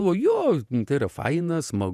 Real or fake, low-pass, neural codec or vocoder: fake; 14.4 kHz; vocoder, 48 kHz, 128 mel bands, Vocos